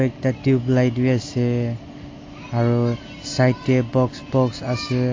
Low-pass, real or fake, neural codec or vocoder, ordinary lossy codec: 7.2 kHz; real; none; AAC, 32 kbps